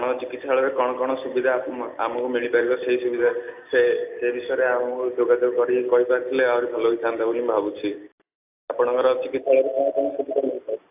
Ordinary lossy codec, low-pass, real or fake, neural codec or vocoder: AAC, 32 kbps; 3.6 kHz; real; none